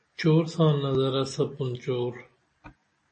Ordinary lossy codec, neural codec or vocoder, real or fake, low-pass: MP3, 32 kbps; none; real; 10.8 kHz